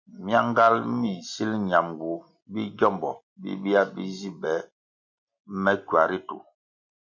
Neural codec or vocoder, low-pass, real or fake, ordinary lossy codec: none; 7.2 kHz; real; MP3, 48 kbps